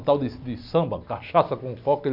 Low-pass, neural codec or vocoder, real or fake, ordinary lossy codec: 5.4 kHz; none; real; none